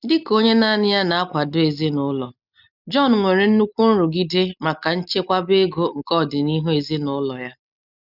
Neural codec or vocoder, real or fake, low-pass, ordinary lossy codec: none; real; 5.4 kHz; none